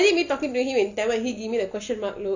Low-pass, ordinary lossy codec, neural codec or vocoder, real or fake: 7.2 kHz; none; none; real